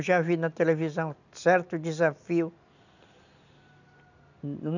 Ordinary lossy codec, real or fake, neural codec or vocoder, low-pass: none; real; none; 7.2 kHz